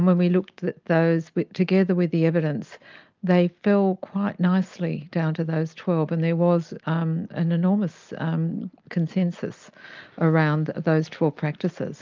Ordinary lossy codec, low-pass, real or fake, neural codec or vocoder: Opus, 24 kbps; 7.2 kHz; real; none